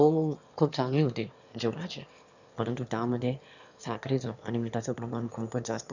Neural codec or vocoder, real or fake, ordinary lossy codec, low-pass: autoencoder, 22.05 kHz, a latent of 192 numbers a frame, VITS, trained on one speaker; fake; none; 7.2 kHz